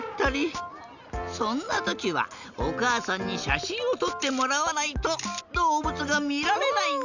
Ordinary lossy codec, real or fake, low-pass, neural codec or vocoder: none; real; 7.2 kHz; none